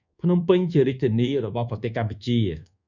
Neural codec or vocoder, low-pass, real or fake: codec, 24 kHz, 1.2 kbps, DualCodec; 7.2 kHz; fake